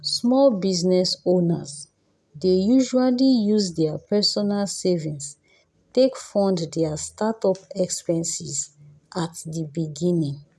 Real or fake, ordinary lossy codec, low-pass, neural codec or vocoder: real; none; none; none